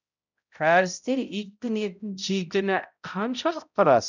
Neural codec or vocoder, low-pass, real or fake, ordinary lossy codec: codec, 16 kHz, 0.5 kbps, X-Codec, HuBERT features, trained on balanced general audio; 7.2 kHz; fake; none